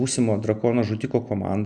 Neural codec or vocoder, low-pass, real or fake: none; 10.8 kHz; real